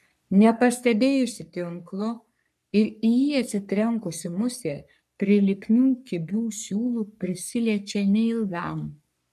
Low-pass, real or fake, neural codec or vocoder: 14.4 kHz; fake; codec, 44.1 kHz, 3.4 kbps, Pupu-Codec